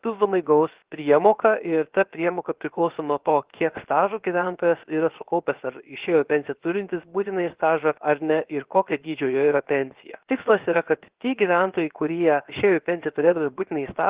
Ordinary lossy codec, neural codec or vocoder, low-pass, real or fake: Opus, 16 kbps; codec, 16 kHz, 0.7 kbps, FocalCodec; 3.6 kHz; fake